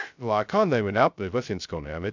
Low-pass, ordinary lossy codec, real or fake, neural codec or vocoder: 7.2 kHz; none; fake; codec, 16 kHz, 0.2 kbps, FocalCodec